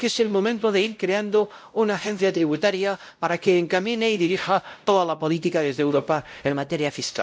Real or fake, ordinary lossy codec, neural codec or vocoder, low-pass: fake; none; codec, 16 kHz, 0.5 kbps, X-Codec, WavLM features, trained on Multilingual LibriSpeech; none